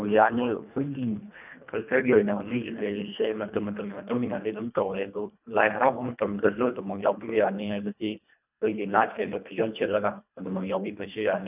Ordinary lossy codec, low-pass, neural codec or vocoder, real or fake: none; 3.6 kHz; codec, 24 kHz, 1.5 kbps, HILCodec; fake